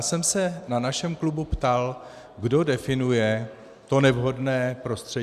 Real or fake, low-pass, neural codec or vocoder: real; 14.4 kHz; none